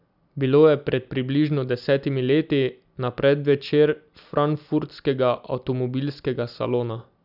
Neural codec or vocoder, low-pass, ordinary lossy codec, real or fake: none; 5.4 kHz; none; real